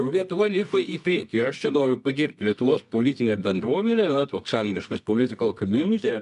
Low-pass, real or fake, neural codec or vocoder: 10.8 kHz; fake; codec, 24 kHz, 0.9 kbps, WavTokenizer, medium music audio release